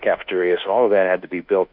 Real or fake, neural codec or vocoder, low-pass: fake; codec, 24 kHz, 1.2 kbps, DualCodec; 5.4 kHz